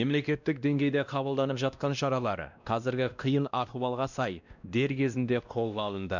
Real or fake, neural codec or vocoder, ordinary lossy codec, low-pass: fake; codec, 16 kHz, 1 kbps, X-Codec, WavLM features, trained on Multilingual LibriSpeech; none; 7.2 kHz